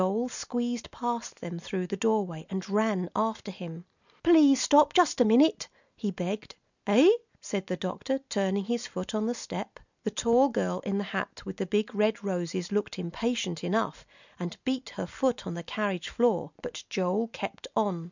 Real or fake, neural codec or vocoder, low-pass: real; none; 7.2 kHz